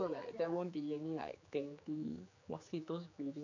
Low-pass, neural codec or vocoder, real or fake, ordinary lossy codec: 7.2 kHz; codec, 16 kHz, 4 kbps, X-Codec, HuBERT features, trained on general audio; fake; AAC, 48 kbps